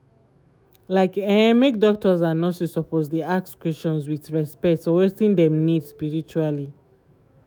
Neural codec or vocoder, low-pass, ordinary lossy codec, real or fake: autoencoder, 48 kHz, 128 numbers a frame, DAC-VAE, trained on Japanese speech; none; none; fake